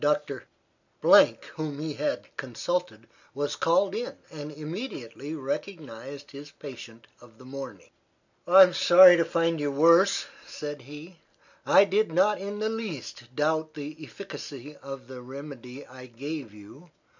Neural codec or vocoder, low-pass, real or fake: none; 7.2 kHz; real